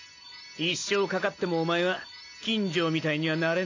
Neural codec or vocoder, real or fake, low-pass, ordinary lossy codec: none; real; 7.2 kHz; AAC, 32 kbps